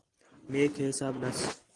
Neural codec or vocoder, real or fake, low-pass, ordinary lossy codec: none; real; 10.8 kHz; Opus, 24 kbps